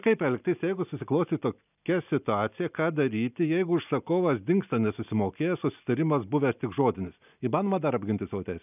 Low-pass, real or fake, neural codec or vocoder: 3.6 kHz; real; none